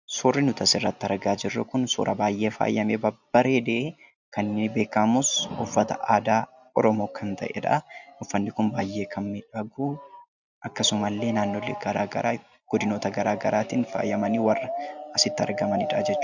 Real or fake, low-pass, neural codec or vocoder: real; 7.2 kHz; none